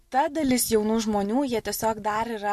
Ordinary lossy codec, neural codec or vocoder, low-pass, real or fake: MP3, 64 kbps; none; 14.4 kHz; real